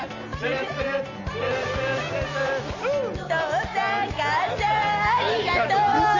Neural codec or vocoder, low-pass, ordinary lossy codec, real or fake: none; 7.2 kHz; MP3, 48 kbps; real